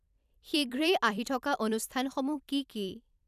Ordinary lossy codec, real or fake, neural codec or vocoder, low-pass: none; fake; vocoder, 44.1 kHz, 128 mel bands every 256 samples, BigVGAN v2; 14.4 kHz